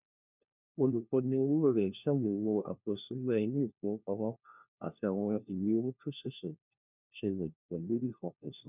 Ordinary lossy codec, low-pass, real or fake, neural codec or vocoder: none; 3.6 kHz; fake; codec, 16 kHz, 1 kbps, FunCodec, trained on LibriTTS, 50 frames a second